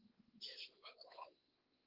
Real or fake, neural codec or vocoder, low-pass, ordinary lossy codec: fake; codec, 16 kHz, 4 kbps, X-Codec, WavLM features, trained on Multilingual LibriSpeech; 5.4 kHz; Opus, 16 kbps